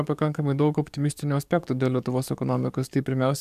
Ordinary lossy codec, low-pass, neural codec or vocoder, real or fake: MP3, 96 kbps; 14.4 kHz; codec, 44.1 kHz, 7.8 kbps, DAC; fake